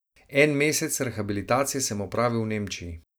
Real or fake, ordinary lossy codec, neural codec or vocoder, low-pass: real; none; none; none